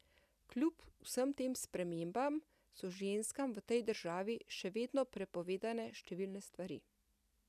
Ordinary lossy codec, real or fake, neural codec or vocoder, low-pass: none; real; none; 14.4 kHz